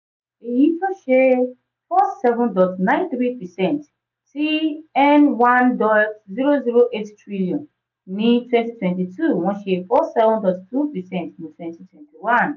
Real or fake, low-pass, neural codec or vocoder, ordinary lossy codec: real; 7.2 kHz; none; none